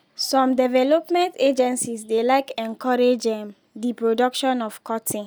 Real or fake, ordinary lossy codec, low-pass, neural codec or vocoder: real; none; none; none